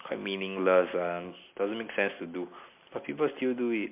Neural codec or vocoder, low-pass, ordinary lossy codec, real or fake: none; 3.6 kHz; MP3, 32 kbps; real